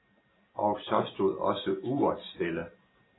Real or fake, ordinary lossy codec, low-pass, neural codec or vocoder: real; AAC, 16 kbps; 7.2 kHz; none